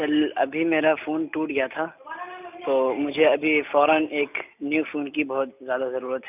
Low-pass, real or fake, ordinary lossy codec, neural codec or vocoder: 3.6 kHz; real; none; none